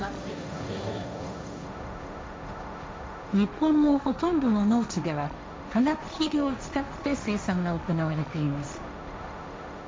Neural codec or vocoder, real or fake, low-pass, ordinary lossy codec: codec, 16 kHz, 1.1 kbps, Voila-Tokenizer; fake; none; none